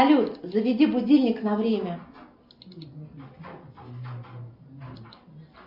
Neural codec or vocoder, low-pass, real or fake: none; 5.4 kHz; real